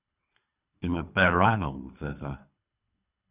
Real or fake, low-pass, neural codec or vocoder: fake; 3.6 kHz; codec, 24 kHz, 3 kbps, HILCodec